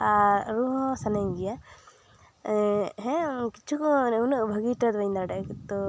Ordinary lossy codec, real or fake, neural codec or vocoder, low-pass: none; real; none; none